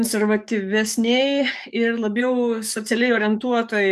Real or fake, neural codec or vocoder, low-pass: fake; codec, 44.1 kHz, 7.8 kbps, DAC; 14.4 kHz